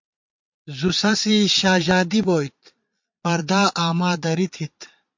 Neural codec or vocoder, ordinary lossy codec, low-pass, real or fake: vocoder, 22.05 kHz, 80 mel bands, Vocos; MP3, 48 kbps; 7.2 kHz; fake